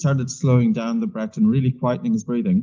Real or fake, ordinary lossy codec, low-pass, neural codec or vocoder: real; Opus, 32 kbps; 7.2 kHz; none